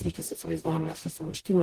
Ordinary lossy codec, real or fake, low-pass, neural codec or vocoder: Opus, 16 kbps; fake; 14.4 kHz; codec, 44.1 kHz, 0.9 kbps, DAC